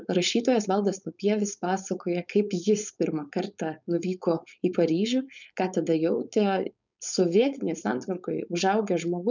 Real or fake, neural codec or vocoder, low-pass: fake; codec, 16 kHz, 4.8 kbps, FACodec; 7.2 kHz